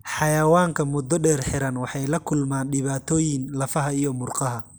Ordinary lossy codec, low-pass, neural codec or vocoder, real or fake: none; none; none; real